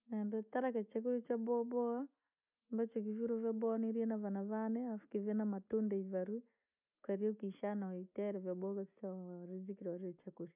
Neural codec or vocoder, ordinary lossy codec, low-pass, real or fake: none; none; 3.6 kHz; real